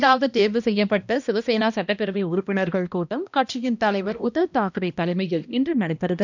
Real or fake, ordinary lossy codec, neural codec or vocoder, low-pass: fake; none; codec, 16 kHz, 1 kbps, X-Codec, HuBERT features, trained on balanced general audio; 7.2 kHz